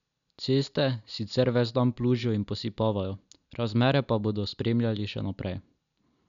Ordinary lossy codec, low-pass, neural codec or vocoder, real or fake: none; 7.2 kHz; none; real